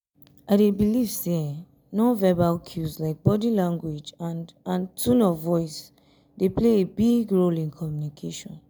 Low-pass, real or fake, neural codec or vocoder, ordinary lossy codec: none; real; none; none